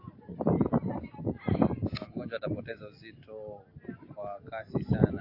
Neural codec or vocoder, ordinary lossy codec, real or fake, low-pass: none; AAC, 32 kbps; real; 5.4 kHz